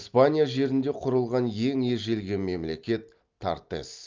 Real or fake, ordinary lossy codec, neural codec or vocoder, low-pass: real; Opus, 32 kbps; none; 7.2 kHz